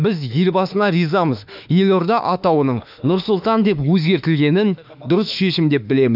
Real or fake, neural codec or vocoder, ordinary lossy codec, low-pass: fake; autoencoder, 48 kHz, 32 numbers a frame, DAC-VAE, trained on Japanese speech; none; 5.4 kHz